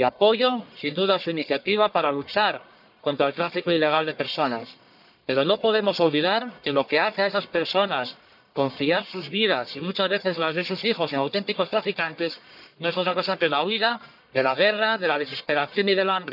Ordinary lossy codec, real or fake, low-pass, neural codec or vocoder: none; fake; 5.4 kHz; codec, 44.1 kHz, 1.7 kbps, Pupu-Codec